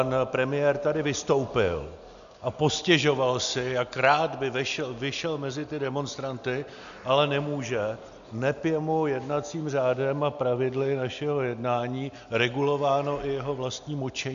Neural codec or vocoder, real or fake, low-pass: none; real; 7.2 kHz